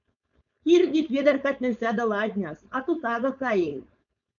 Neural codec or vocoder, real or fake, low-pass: codec, 16 kHz, 4.8 kbps, FACodec; fake; 7.2 kHz